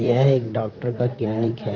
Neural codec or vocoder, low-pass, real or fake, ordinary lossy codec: vocoder, 44.1 kHz, 128 mel bands, Pupu-Vocoder; 7.2 kHz; fake; none